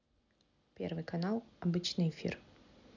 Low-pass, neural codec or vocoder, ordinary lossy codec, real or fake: 7.2 kHz; none; none; real